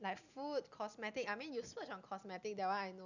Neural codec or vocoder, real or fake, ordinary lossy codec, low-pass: none; real; none; 7.2 kHz